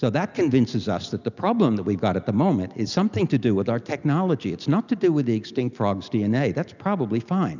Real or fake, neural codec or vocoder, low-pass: real; none; 7.2 kHz